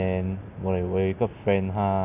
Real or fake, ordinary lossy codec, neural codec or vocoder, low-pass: real; none; none; 3.6 kHz